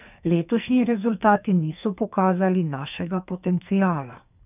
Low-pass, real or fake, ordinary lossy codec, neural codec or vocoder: 3.6 kHz; fake; none; codec, 44.1 kHz, 2.6 kbps, SNAC